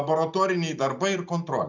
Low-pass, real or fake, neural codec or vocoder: 7.2 kHz; real; none